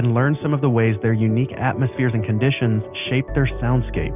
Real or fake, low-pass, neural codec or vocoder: real; 3.6 kHz; none